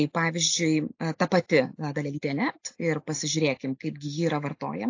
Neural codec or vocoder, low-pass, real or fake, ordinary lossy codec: none; 7.2 kHz; real; AAC, 48 kbps